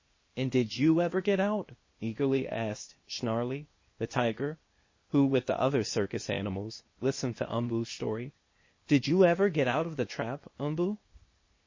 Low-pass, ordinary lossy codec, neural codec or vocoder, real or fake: 7.2 kHz; MP3, 32 kbps; codec, 16 kHz, 0.8 kbps, ZipCodec; fake